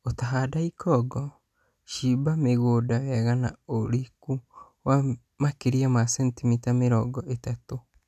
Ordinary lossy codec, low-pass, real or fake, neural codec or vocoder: none; 14.4 kHz; real; none